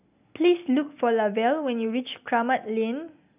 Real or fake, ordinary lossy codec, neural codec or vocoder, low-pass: real; none; none; 3.6 kHz